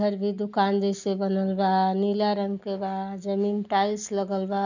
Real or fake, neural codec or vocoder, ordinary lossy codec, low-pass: real; none; none; 7.2 kHz